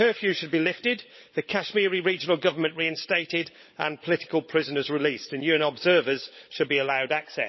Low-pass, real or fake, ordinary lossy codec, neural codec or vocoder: 7.2 kHz; fake; MP3, 24 kbps; codec, 16 kHz, 16 kbps, FunCodec, trained on LibriTTS, 50 frames a second